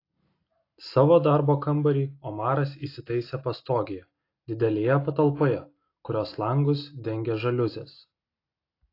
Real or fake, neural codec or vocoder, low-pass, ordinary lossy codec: real; none; 5.4 kHz; AAC, 32 kbps